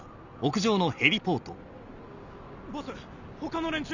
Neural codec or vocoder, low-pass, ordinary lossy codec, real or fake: none; 7.2 kHz; none; real